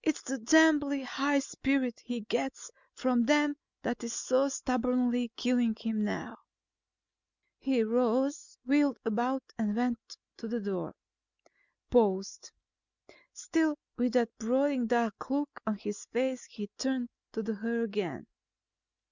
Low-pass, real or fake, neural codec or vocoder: 7.2 kHz; real; none